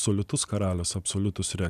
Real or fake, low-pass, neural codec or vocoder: real; 14.4 kHz; none